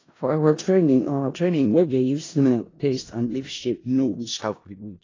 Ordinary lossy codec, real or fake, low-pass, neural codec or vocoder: AAC, 32 kbps; fake; 7.2 kHz; codec, 16 kHz in and 24 kHz out, 0.4 kbps, LongCat-Audio-Codec, four codebook decoder